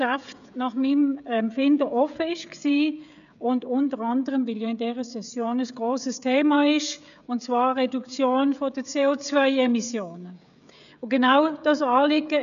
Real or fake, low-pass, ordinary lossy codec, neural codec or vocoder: fake; 7.2 kHz; none; codec, 16 kHz, 16 kbps, FreqCodec, smaller model